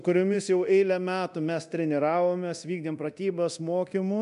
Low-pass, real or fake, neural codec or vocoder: 10.8 kHz; fake; codec, 24 kHz, 0.9 kbps, DualCodec